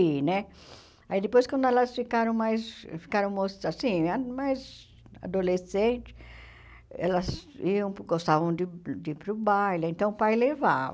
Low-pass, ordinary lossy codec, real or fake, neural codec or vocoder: none; none; real; none